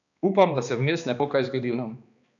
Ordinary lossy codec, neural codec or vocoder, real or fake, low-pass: none; codec, 16 kHz, 2 kbps, X-Codec, HuBERT features, trained on balanced general audio; fake; 7.2 kHz